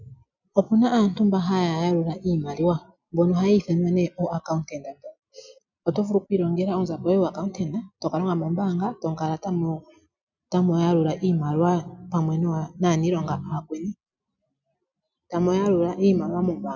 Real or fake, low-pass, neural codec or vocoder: real; 7.2 kHz; none